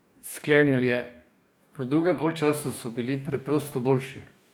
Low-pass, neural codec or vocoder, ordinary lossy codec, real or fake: none; codec, 44.1 kHz, 2.6 kbps, DAC; none; fake